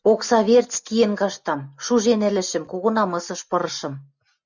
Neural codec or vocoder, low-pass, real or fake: none; 7.2 kHz; real